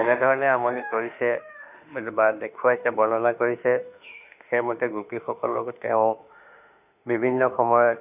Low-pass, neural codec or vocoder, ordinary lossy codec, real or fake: 3.6 kHz; autoencoder, 48 kHz, 32 numbers a frame, DAC-VAE, trained on Japanese speech; none; fake